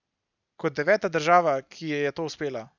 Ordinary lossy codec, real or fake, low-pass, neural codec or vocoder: none; real; 7.2 kHz; none